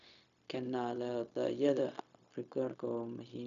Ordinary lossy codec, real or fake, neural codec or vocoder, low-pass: none; fake; codec, 16 kHz, 0.4 kbps, LongCat-Audio-Codec; 7.2 kHz